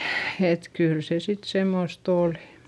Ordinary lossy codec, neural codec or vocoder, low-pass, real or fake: none; none; none; real